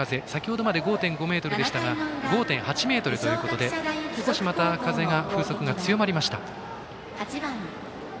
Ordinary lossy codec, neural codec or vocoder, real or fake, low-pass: none; none; real; none